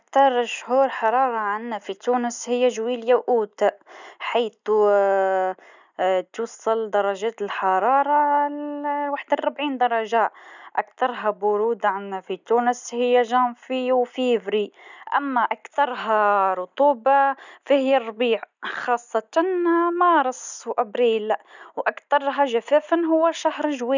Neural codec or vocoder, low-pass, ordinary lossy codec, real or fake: none; 7.2 kHz; none; real